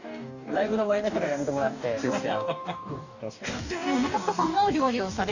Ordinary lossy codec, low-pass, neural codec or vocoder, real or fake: none; 7.2 kHz; codec, 44.1 kHz, 2.6 kbps, DAC; fake